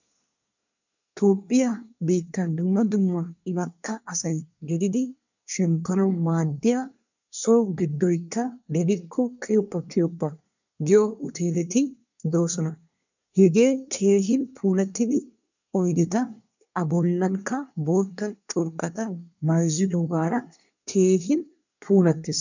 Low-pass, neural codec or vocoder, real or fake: 7.2 kHz; codec, 24 kHz, 1 kbps, SNAC; fake